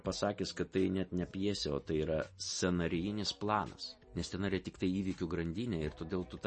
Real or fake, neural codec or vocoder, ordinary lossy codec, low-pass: real; none; MP3, 32 kbps; 10.8 kHz